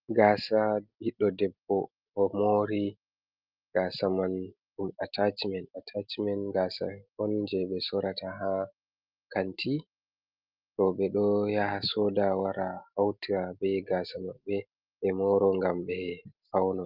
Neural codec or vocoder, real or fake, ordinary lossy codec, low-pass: none; real; Opus, 32 kbps; 5.4 kHz